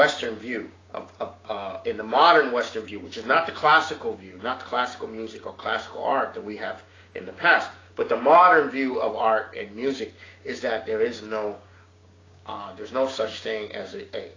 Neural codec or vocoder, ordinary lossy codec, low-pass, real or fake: codec, 16 kHz, 6 kbps, DAC; AAC, 32 kbps; 7.2 kHz; fake